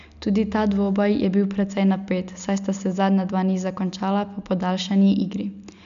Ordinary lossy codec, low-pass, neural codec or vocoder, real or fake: none; 7.2 kHz; none; real